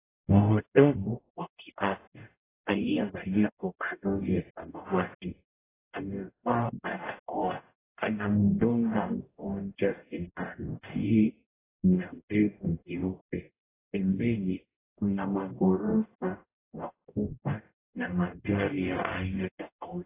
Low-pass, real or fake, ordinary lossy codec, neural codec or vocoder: 3.6 kHz; fake; AAC, 16 kbps; codec, 44.1 kHz, 0.9 kbps, DAC